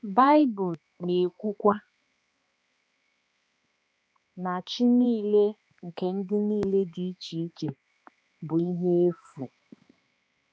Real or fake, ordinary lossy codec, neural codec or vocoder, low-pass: fake; none; codec, 16 kHz, 2 kbps, X-Codec, HuBERT features, trained on balanced general audio; none